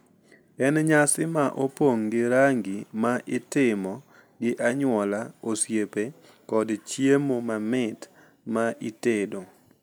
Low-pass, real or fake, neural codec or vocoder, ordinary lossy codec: none; real; none; none